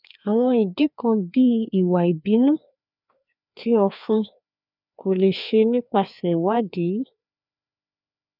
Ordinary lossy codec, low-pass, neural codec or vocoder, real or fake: none; 5.4 kHz; codec, 16 kHz, 2 kbps, FreqCodec, larger model; fake